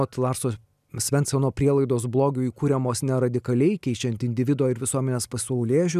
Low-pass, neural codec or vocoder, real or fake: 14.4 kHz; none; real